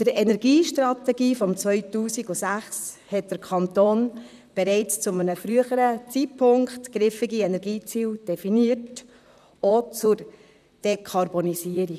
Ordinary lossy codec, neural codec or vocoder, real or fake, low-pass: none; vocoder, 44.1 kHz, 128 mel bands, Pupu-Vocoder; fake; 14.4 kHz